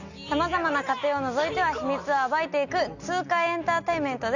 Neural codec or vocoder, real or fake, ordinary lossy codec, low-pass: none; real; none; 7.2 kHz